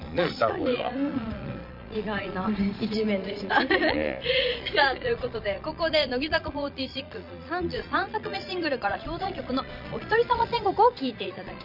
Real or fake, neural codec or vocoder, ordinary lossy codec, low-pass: fake; vocoder, 22.05 kHz, 80 mel bands, Vocos; none; 5.4 kHz